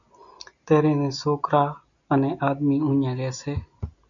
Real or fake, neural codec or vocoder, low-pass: real; none; 7.2 kHz